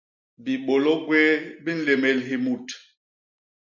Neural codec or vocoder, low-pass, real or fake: none; 7.2 kHz; real